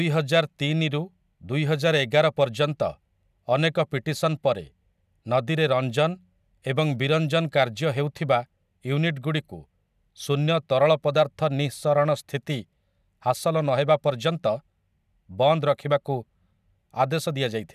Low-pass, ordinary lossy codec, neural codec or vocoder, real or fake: 14.4 kHz; none; none; real